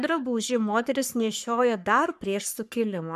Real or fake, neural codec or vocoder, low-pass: fake; codec, 44.1 kHz, 3.4 kbps, Pupu-Codec; 14.4 kHz